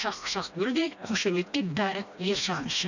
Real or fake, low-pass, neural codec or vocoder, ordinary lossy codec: fake; 7.2 kHz; codec, 16 kHz, 1 kbps, FreqCodec, smaller model; none